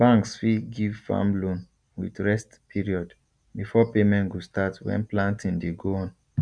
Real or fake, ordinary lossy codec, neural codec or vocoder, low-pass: real; none; none; 9.9 kHz